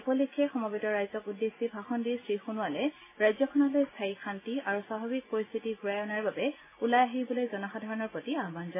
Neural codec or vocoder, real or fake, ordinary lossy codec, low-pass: none; real; MP3, 16 kbps; 3.6 kHz